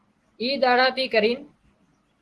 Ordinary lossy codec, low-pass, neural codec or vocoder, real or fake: Opus, 16 kbps; 10.8 kHz; none; real